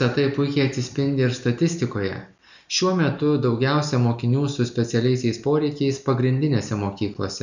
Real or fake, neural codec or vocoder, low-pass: real; none; 7.2 kHz